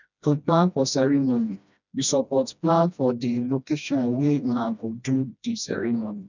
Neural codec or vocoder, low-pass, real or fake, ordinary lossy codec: codec, 16 kHz, 1 kbps, FreqCodec, smaller model; 7.2 kHz; fake; none